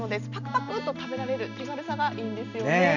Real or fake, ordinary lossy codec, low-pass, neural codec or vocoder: real; none; 7.2 kHz; none